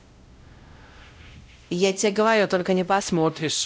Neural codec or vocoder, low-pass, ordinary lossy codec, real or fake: codec, 16 kHz, 0.5 kbps, X-Codec, WavLM features, trained on Multilingual LibriSpeech; none; none; fake